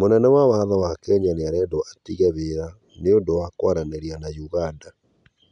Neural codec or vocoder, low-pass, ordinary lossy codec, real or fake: none; 10.8 kHz; none; real